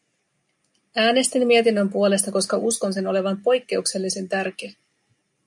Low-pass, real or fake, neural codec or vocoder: 10.8 kHz; real; none